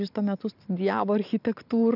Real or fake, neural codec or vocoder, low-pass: real; none; 5.4 kHz